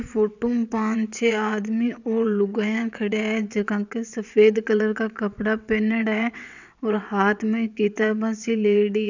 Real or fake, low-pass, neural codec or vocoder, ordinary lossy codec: fake; 7.2 kHz; vocoder, 22.05 kHz, 80 mel bands, WaveNeXt; none